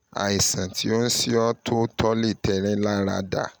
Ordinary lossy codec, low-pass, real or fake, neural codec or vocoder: none; none; real; none